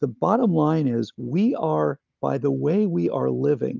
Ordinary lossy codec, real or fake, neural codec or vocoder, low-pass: Opus, 32 kbps; real; none; 7.2 kHz